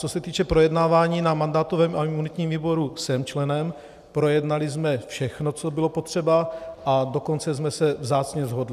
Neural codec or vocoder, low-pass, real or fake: none; 14.4 kHz; real